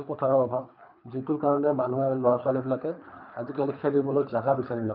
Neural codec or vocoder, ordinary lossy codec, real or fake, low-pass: codec, 24 kHz, 3 kbps, HILCodec; none; fake; 5.4 kHz